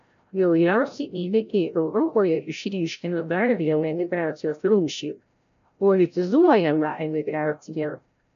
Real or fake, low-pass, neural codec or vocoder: fake; 7.2 kHz; codec, 16 kHz, 0.5 kbps, FreqCodec, larger model